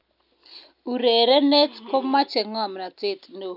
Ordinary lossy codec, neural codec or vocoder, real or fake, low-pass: none; none; real; 5.4 kHz